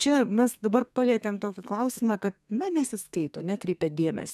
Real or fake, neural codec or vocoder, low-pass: fake; codec, 32 kHz, 1.9 kbps, SNAC; 14.4 kHz